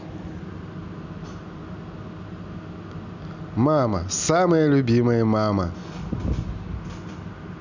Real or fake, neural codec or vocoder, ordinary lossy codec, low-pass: real; none; none; 7.2 kHz